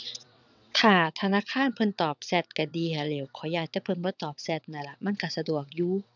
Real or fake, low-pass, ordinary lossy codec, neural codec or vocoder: fake; 7.2 kHz; none; vocoder, 22.05 kHz, 80 mel bands, WaveNeXt